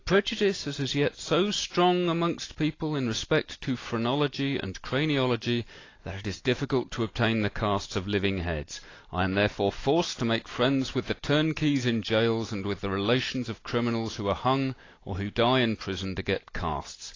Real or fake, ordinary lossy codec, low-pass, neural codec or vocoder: real; AAC, 32 kbps; 7.2 kHz; none